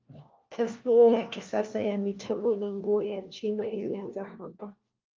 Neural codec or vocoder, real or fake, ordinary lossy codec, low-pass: codec, 16 kHz, 1 kbps, FunCodec, trained on LibriTTS, 50 frames a second; fake; Opus, 24 kbps; 7.2 kHz